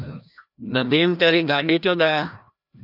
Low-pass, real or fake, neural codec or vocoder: 5.4 kHz; fake; codec, 16 kHz, 1 kbps, FreqCodec, larger model